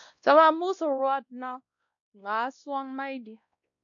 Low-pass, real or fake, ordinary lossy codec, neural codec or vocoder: 7.2 kHz; fake; AAC, 64 kbps; codec, 16 kHz, 1 kbps, X-Codec, WavLM features, trained on Multilingual LibriSpeech